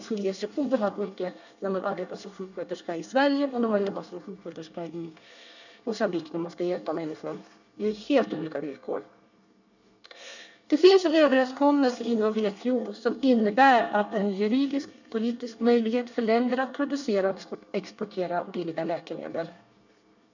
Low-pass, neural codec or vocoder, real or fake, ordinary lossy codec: 7.2 kHz; codec, 24 kHz, 1 kbps, SNAC; fake; none